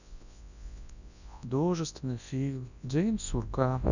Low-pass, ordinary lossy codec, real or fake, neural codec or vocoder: 7.2 kHz; none; fake; codec, 24 kHz, 0.9 kbps, WavTokenizer, large speech release